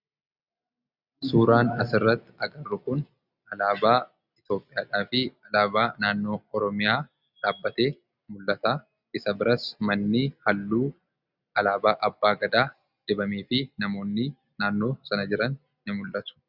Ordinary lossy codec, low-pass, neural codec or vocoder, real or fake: Opus, 64 kbps; 5.4 kHz; none; real